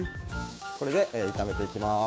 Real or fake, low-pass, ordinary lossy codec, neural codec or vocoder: fake; none; none; codec, 16 kHz, 6 kbps, DAC